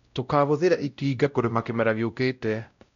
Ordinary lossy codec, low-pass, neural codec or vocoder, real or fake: none; 7.2 kHz; codec, 16 kHz, 0.5 kbps, X-Codec, WavLM features, trained on Multilingual LibriSpeech; fake